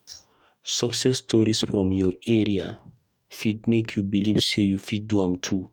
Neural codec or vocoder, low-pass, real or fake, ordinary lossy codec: codec, 44.1 kHz, 2.6 kbps, DAC; 19.8 kHz; fake; none